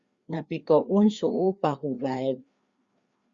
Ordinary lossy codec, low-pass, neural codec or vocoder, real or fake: Opus, 64 kbps; 7.2 kHz; codec, 16 kHz, 2 kbps, FreqCodec, larger model; fake